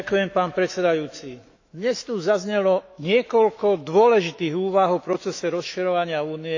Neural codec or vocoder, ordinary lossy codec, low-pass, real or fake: autoencoder, 48 kHz, 128 numbers a frame, DAC-VAE, trained on Japanese speech; AAC, 48 kbps; 7.2 kHz; fake